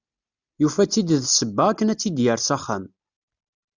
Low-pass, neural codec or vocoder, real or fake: 7.2 kHz; none; real